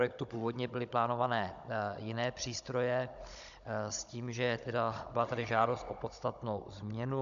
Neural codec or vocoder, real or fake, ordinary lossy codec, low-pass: codec, 16 kHz, 16 kbps, FunCodec, trained on Chinese and English, 50 frames a second; fake; AAC, 96 kbps; 7.2 kHz